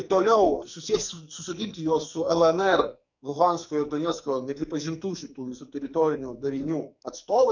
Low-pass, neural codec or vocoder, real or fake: 7.2 kHz; codec, 44.1 kHz, 2.6 kbps, SNAC; fake